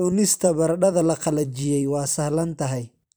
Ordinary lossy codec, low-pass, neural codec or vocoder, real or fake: none; none; vocoder, 44.1 kHz, 128 mel bands every 256 samples, BigVGAN v2; fake